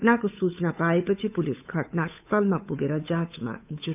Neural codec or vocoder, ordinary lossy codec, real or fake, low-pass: codec, 16 kHz, 8 kbps, FunCodec, trained on Chinese and English, 25 frames a second; none; fake; 3.6 kHz